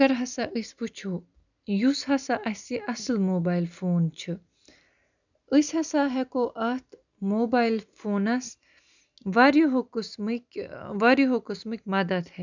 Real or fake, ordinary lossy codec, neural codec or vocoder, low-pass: real; none; none; 7.2 kHz